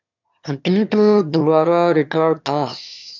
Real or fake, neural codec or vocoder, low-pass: fake; autoencoder, 22.05 kHz, a latent of 192 numbers a frame, VITS, trained on one speaker; 7.2 kHz